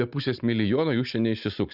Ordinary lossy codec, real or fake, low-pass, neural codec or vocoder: Opus, 64 kbps; real; 5.4 kHz; none